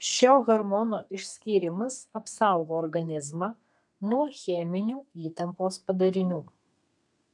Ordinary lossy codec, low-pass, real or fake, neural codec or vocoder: MP3, 96 kbps; 10.8 kHz; fake; codec, 32 kHz, 1.9 kbps, SNAC